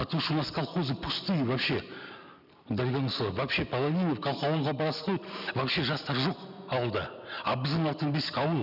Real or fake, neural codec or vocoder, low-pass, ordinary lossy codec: real; none; 5.4 kHz; none